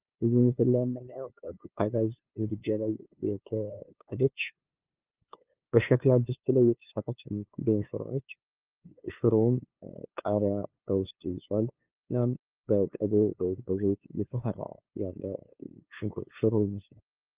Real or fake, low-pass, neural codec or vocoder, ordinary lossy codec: fake; 3.6 kHz; codec, 16 kHz, 2 kbps, FunCodec, trained on LibriTTS, 25 frames a second; Opus, 24 kbps